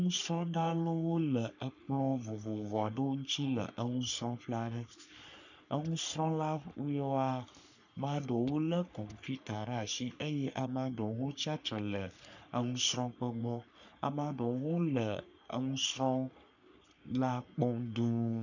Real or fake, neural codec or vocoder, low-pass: fake; codec, 44.1 kHz, 3.4 kbps, Pupu-Codec; 7.2 kHz